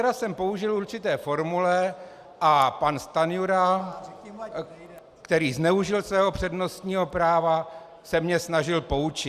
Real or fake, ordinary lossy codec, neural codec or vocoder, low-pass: real; Opus, 64 kbps; none; 14.4 kHz